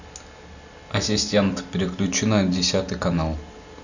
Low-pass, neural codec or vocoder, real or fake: 7.2 kHz; none; real